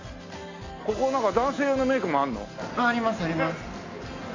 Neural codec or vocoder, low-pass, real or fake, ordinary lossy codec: none; 7.2 kHz; real; AAC, 32 kbps